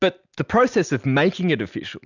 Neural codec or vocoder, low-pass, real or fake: none; 7.2 kHz; real